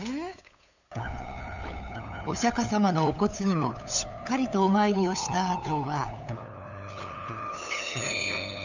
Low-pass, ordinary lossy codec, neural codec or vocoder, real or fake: 7.2 kHz; none; codec, 16 kHz, 8 kbps, FunCodec, trained on LibriTTS, 25 frames a second; fake